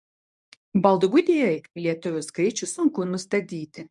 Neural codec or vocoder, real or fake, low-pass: codec, 24 kHz, 0.9 kbps, WavTokenizer, medium speech release version 1; fake; 10.8 kHz